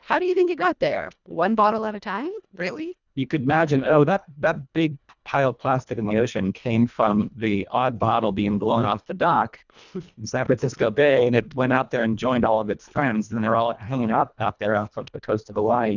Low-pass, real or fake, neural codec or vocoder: 7.2 kHz; fake; codec, 24 kHz, 1.5 kbps, HILCodec